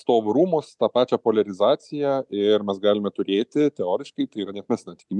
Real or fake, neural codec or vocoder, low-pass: real; none; 10.8 kHz